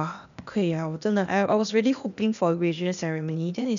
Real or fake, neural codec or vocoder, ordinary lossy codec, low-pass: fake; codec, 16 kHz, 0.8 kbps, ZipCodec; none; 7.2 kHz